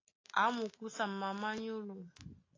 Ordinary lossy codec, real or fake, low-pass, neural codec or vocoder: AAC, 32 kbps; real; 7.2 kHz; none